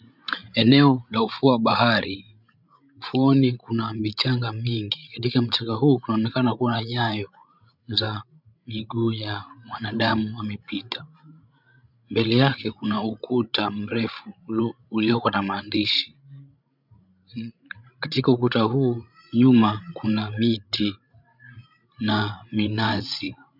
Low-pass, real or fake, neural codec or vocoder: 5.4 kHz; fake; codec, 16 kHz, 16 kbps, FreqCodec, larger model